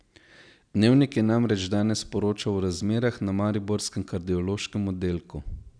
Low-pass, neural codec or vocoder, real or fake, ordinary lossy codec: 9.9 kHz; none; real; none